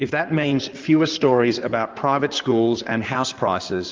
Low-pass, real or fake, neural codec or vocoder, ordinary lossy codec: 7.2 kHz; fake; codec, 16 kHz in and 24 kHz out, 2.2 kbps, FireRedTTS-2 codec; Opus, 32 kbps